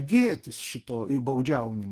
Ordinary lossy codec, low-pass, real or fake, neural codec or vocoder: Opus, 24 kbps; 14.4 kHz; fake; codec, 44.1 kHz, 2.6 kbps, DAC